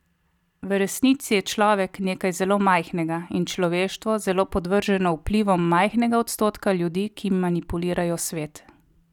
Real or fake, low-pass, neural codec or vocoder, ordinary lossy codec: real; 19.8 kHz; none; none